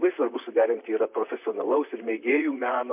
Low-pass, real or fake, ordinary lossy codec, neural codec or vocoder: 3.6 kHz; fake; MP3, 32 kbps; vocoder, 44.1 kHz, 128 mel bands, Pupu-Vocoder